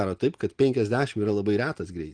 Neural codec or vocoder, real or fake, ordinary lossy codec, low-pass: none; real; Opus, 24 kbps; 9.9 kHz